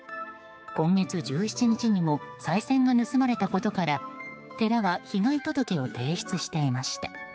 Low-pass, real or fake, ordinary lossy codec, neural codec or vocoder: none; fake; none; codec, 16 kHz, 4 kbps, X-Codec, HuBERT features, trained on general audio